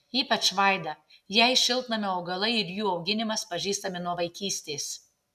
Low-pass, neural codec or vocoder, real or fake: 14.4 kHz; none; real